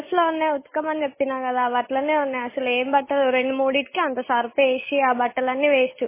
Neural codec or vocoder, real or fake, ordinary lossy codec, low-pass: none; real; MP3, 16 kbps; 3.6 kHz